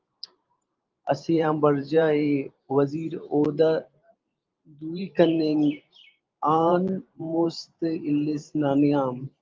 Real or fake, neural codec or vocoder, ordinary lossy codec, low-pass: fake; vocoder, 44.1 kHz, 128 mel bands every 512 samples, BigVGAN v2; Opus, 32 kbps; 7.2 kHz